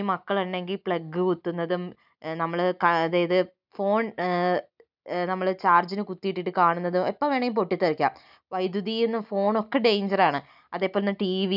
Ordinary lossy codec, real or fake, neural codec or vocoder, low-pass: none; real; none; 5.4 kHz